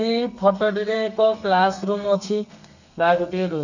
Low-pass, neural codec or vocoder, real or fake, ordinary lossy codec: 7.2 kHz; codec, 32 kHz, 1.9 kbps, SNAC; fake; none